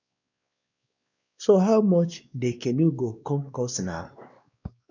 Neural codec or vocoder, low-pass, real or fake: codec, 16 kHz, 2 kbps, X-Codec, WavLM features, trained on Multilingual LibriSpeech; 7.2 kHz; fake